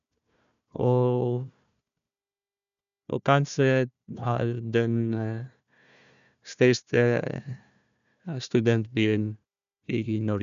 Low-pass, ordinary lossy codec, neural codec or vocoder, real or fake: 7.2 kHz; none; codec, 16 kHz, 1 kbps, FunCodec, trained on Chinese and English, 50 frames a second; fake